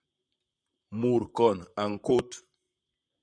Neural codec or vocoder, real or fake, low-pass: vocoder, 44.1 kHz, 128 mel bands, Pupu-Vocoder; fake; 9.9 kHz